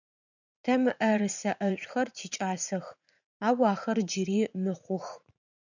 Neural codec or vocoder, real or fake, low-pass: vocoder, 44.1 kHz, 80 mel bands, Vocos; fake; 7.2 kHz